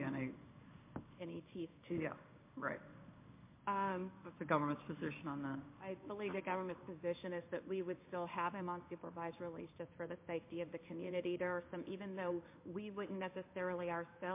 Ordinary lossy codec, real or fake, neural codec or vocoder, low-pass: MP3, 24 kbps; fake; codec, 16 kHz in and 24 kHz out, 1 kbps, XY-Tokenizer; 3.6 kHz